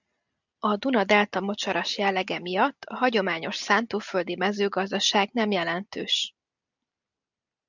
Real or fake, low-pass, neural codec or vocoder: real; 7.2 kHz; none